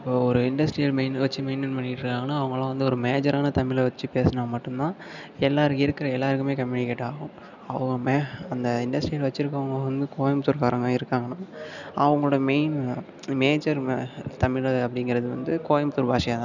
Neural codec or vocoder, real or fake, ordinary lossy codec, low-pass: none; real; none; 7.2 kHz